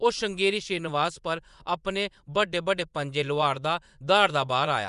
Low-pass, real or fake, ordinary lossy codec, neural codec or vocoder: 10.8 kHz; fake; none; vocoder, 24 kHz, 100 mel bands, Vocos